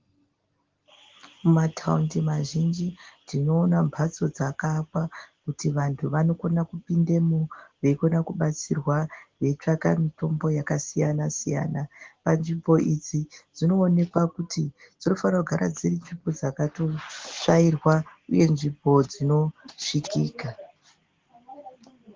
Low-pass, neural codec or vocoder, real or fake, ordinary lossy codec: 7.2 kHz; none; real; Opus, 16 kbps